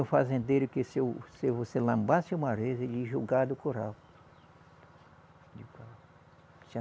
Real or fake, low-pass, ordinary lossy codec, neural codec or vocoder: real; none; none; none